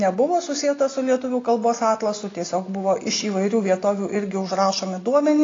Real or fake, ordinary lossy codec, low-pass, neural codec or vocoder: real; AAC, 32 kbps; 7.2 kHz; none